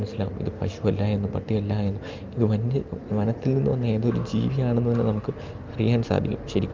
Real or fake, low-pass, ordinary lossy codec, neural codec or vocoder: real; 7.2 kHz; Opus, 24 kbps; none